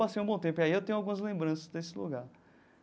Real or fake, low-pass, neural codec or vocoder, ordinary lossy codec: real; none; none; none